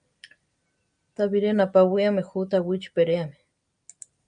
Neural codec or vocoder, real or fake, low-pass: none; real; 9.9 kHz